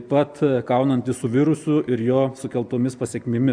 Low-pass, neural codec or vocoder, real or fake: 9.9 kHz; none; real